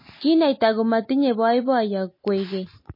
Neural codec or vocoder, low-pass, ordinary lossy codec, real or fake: none; 5.4 kHz; MP3, 24 kbps; real